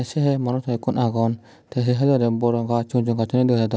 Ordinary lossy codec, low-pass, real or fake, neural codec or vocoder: none; none; real; none